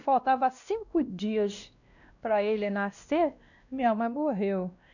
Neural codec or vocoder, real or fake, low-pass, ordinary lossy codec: codec, 16 kHz, 1 kbps, X-Codec, WavLM features, trained on Multilingual LibriSpeech; fake; 7.2 kHz; none